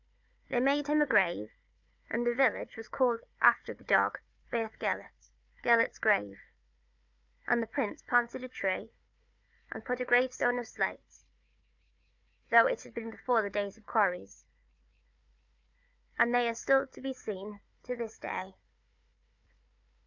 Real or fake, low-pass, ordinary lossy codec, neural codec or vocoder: fake; 7.2 kHz; MP3, 64 kbps; codec, 16 kHz, 4 kbps, FunCodec, trained on Chinese and English, 50 frames a second